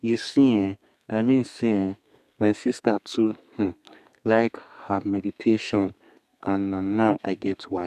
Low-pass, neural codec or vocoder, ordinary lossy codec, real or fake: 14.4 kHz; codec, 32 kHz, 1.9 kbps, SNAC; none; fake